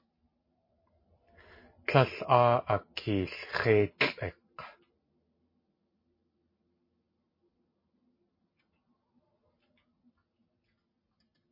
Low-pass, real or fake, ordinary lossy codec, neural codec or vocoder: 5.4 kHz; real; MP3, 24 kbps; none